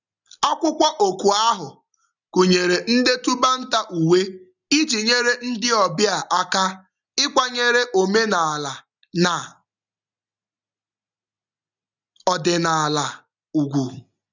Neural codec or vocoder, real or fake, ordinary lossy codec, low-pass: none; real; none; 7.2 kHz